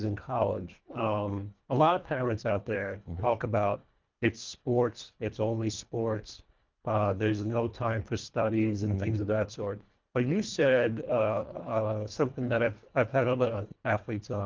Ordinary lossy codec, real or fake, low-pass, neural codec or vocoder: Opus, 32 kbps; fake; 7.2 kHz; codec, 24 kHz, 1.5 kbps, HILCodec